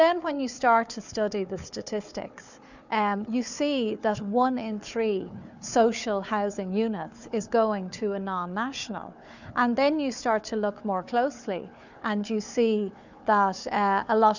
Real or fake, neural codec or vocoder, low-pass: fake; codec, 16 kHz, 4 kbps, FunCodec, trained on Chinese and English, 50 frames a second; 7.2 kHz